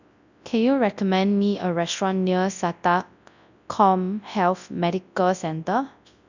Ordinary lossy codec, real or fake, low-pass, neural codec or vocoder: none; fake; 7.2 kHz; codec, 24 kHz, 0.9 kbps, WavTokenizer, large speech release